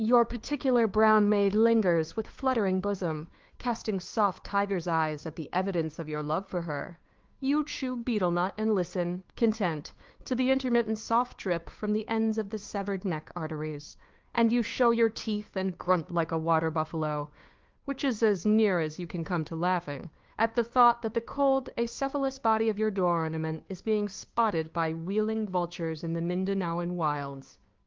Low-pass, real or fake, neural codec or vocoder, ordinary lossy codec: 7.2 kHz; fake; codec, 16 kHz, 2 kbps, FunCodec, trained on Chinese and English, 25 frames a second; Opus, 32 kbps